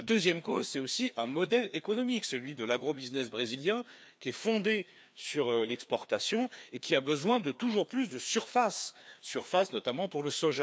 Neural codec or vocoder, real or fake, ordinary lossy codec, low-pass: codec, 16 kHz, 2 kbps, FreqCodec, larger model; fake; none; none